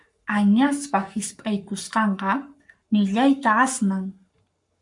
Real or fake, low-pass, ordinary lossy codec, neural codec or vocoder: fake; 10.8 kHz; MP3, 64 kbps; codec, 44.1 kHz, 7.8 kbps, Pupu-Codec